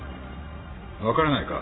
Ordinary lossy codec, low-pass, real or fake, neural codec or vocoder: AAC, 16 kbps; 7.2 kHz; fake; vocoder, 44.1 kHz, 80 mel bands, Vocos